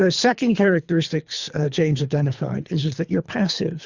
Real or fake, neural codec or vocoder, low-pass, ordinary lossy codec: fake; codec, 24 kHz, 3 kbps, HILCodec; 7.2 kHz; Opus, 64 kbps